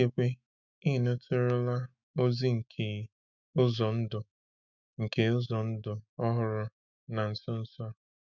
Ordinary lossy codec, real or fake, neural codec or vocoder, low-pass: none; fake; autoencoder, 48 kHz, 128 numbers a frame, DAC-VAE, trained on Japanese speech; 7.2 kHz